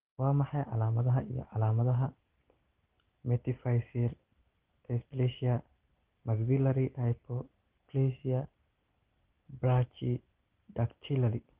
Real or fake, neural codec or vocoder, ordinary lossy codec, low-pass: real; none; Opus, 16 kbps; 3.6 kHz